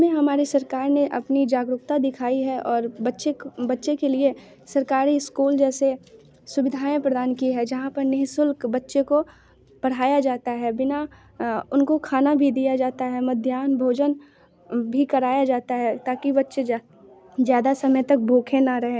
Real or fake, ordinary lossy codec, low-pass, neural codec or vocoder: real; none; none; none